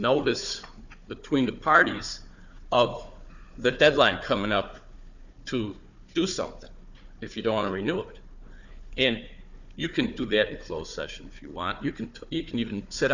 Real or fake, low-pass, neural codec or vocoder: fake; 7.2 kHz; codec, 16 kHz, 4 kbps, FunCodec, trained on Chinese and English, 50 frames a second